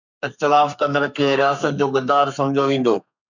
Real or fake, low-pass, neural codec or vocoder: fake; 7.2 kHz; codec, 32 kHz, 1.9 kbps, SNAC